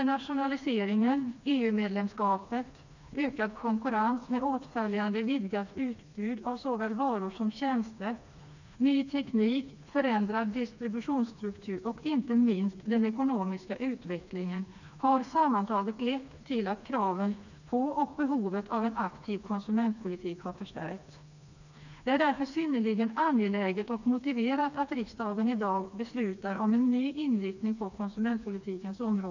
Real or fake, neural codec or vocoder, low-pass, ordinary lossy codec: fake; codec, 16 kHz, 2 kbps, FreqCodec, smaller model; 7.2 kHz; none